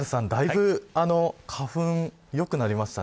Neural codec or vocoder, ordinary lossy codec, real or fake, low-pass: none; none; real; none